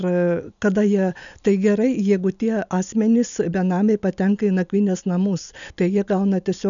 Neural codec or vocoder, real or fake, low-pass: none; real; 7.2 kHz